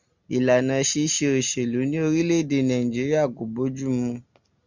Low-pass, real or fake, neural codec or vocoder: 7.2 kHz; real; none